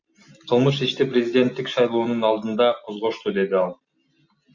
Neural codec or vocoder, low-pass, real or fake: none; 7.2 kHz; real